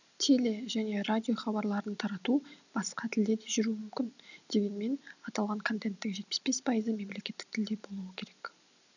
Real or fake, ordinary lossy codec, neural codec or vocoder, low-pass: real; none; none; 7.2 kHz